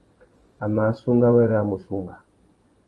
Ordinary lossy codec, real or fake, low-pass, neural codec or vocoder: Opus, 24 kbps; fake; 10.8 kHz; vocoder, 24 kHz, 100 mel bands, Vocos